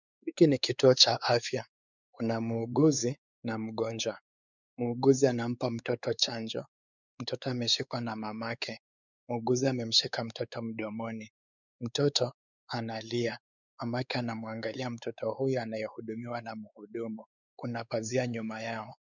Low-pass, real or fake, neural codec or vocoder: 7.2 kHz; fake; codec, 16 kHz, 4 kbps, X-Codec, WavLM features, trained on Multilingual LibriSpeech